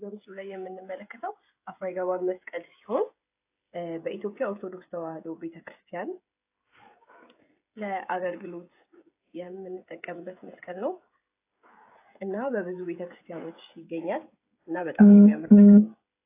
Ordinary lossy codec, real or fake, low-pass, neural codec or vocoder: AAC, 24 kbps; fake; 3.6 kHz; codec, 16 kHz, 16 kbps, FreqCodec, smaller model